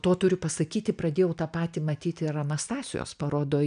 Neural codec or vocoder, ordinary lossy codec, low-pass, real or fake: none; AAC, 96 kbps; 9.9 kHz; real